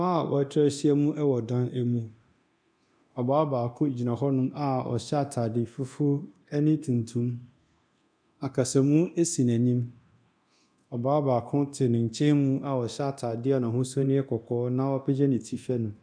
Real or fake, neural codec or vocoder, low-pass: fake; codec, 24 kHz, 0.9 kbps, DualCodec; 9.9 kHz